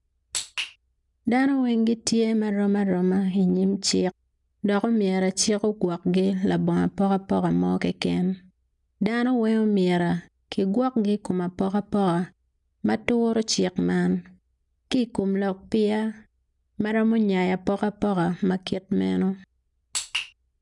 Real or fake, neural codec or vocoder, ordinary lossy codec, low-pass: real; none; none; 10.8 kHz